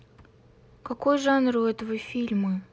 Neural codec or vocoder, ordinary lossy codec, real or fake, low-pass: none; none; real; none